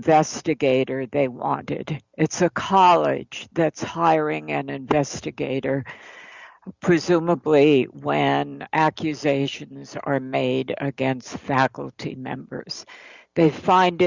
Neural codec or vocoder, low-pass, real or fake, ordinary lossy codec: none; 7.2 kHz; real; Opus, 64 kbps